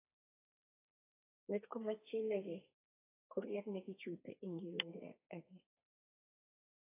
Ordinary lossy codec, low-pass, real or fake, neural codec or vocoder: AAC, 24 kbps; 3.6 kHz; fake; codec, 32 kHz, 1.9 kbps, SNAC